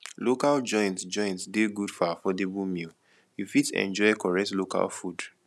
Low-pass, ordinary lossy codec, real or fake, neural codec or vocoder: none; none; real; none